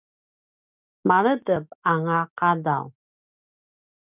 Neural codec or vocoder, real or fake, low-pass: none; real; 3.6 kHz